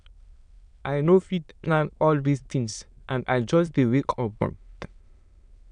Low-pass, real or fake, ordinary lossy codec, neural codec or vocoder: 9.9 kHz; fake; none; autoencoder, 22.05 kHz, a latent of 192 numbers a frame, VITS, trained on many speakers